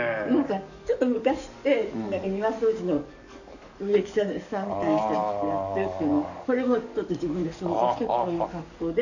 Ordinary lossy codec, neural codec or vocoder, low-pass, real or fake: none; codec, 44.1 kHz, 7.8 kbps, DAC; 7.2 kHz; fake